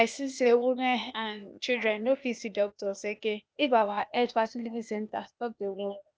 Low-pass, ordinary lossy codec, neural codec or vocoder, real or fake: none; none; codec, 16 kHz, 0.8 kbps, ZipCodec; fake